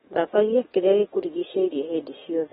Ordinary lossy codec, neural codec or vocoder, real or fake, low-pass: AAC, 16 kbps; codec, 44.1 kHz, 7.8 kbps, DAC; fake; 19.8 kHz